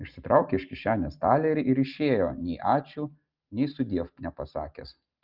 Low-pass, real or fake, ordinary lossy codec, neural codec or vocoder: 5.4 kHz; real; Opus, 24 kbps; none